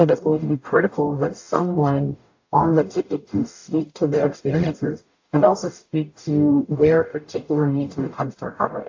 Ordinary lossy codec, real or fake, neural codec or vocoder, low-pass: AAC, 48 kbps; fake; codec, 44.1 kHz, 0.9 kbps, DAC; 7.2 kHz